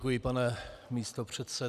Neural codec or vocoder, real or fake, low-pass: none; real; 14.4 kHz